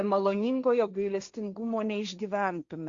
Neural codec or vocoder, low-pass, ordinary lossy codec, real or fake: codec, 16 kHz, 1.1 kbps, Voila-Tokenizer; 7.2 kHz; Opus, 64 kbps; fake